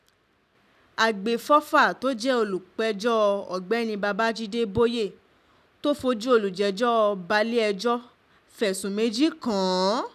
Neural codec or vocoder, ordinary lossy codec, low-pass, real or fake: none; none; 14.4 kHz; real